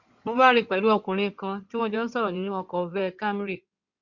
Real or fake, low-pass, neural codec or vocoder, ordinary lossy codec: fake; 7.2 kHz; codec, 16 kHz in and 24 kHz out, 2.2 kbps, FireRedTTS-2 codec; Opus, 64 kbps